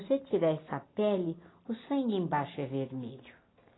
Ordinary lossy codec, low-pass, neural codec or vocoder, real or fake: AAC, 16 kbps; 7.2 kHz; none; real